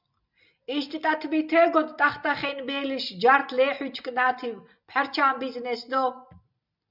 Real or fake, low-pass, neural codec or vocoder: real; 5.4 kHz; none